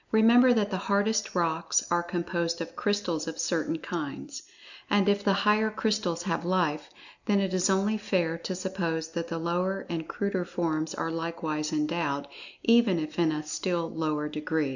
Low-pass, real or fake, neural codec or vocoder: 7.2 kHz; real; none